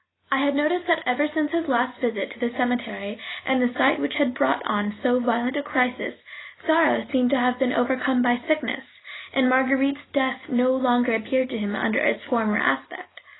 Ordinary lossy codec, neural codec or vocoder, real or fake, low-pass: AAC, 16 kbps; none; real; 7.2 kHz